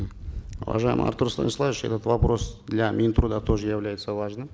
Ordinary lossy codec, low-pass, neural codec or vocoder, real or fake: none; none; none; real